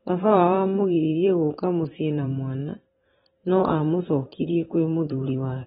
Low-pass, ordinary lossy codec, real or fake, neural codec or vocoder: 19.8 kHz; AAC, 16 kbps; fake; vocoder, 44.1 kHz, 128 mel bands every 512 samples, BigVGAN v2